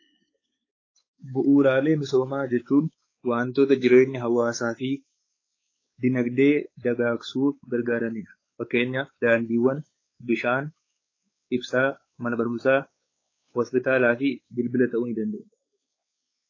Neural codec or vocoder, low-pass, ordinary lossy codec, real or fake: codec, 16 kHz, 4 kbps, X-Codec, WavLM features, trained on Multilingual LibriSpeech; 7.2 kHz; AAC, 32 kbps; fake